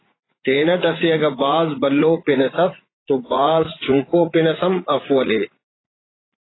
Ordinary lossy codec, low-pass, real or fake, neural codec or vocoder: AAC, 16 kbps; 7.2 kHz; fake; vocoder, 44.1 kHz, 80 mel bands, Vocos